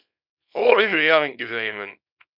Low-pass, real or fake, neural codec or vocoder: 5.4 kHz; fake; codec, 24 kHz, 0.9 kbps, WavTokenizer, small release